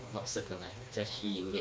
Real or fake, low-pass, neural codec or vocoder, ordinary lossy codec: fake; none; codec, 16 kHz, 2 kbps, FreqCodec, smaller model; none